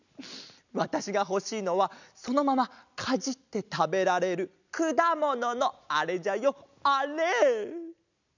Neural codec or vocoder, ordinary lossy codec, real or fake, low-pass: none; none; real; 7.2 kHz